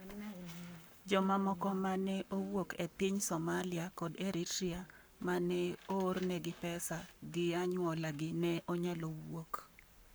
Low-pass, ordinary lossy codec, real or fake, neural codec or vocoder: none; none; fake; codec, 44.1 kHz, 7.8 kbps, Pupu-Codec